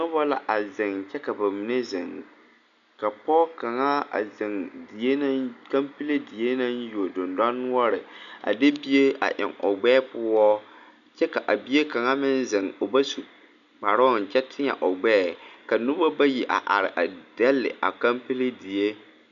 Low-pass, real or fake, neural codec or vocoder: 7.2 kHz; real; none